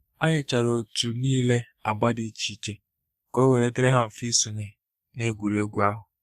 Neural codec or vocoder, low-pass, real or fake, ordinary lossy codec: codec, 32 kHz, 1.9 kbps, SNAC; 14.4 kHz; fake; Opus, 64 kbps